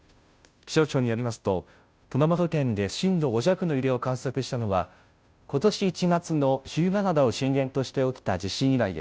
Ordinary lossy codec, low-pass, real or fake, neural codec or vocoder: none; none; fake; codec, 16 kHz, 0.5 kbps, FunCodec, trained on Chinese and English, 25 frames a second